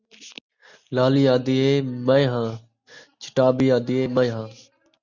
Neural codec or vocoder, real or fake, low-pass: none; real; 7.2 kHz